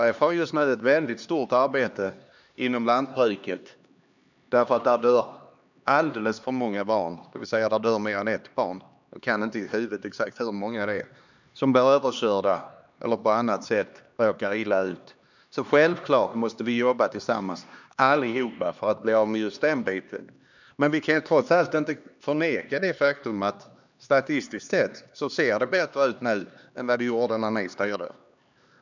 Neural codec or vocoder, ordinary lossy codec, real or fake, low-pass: codec, 16 kHz, 2 kbps, X-Codec, HuBERT features, trained on LibriSpeech; none; fake; 7.2 kHz